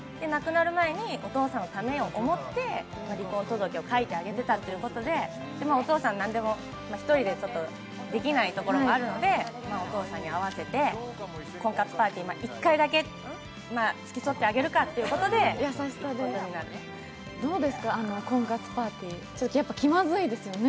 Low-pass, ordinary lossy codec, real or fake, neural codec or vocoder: none; none; real; none